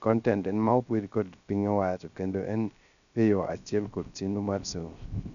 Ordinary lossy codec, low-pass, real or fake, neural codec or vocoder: none; 7.2 kHz; fake; codec, 16 kHz, 0.3 kbps, FocalCodec